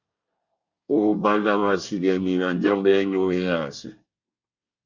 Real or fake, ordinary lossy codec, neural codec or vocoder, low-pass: fake; Opus, 64 kbps; codec, 24 kHz, 1 kbps, SNAC; 7.2 kHz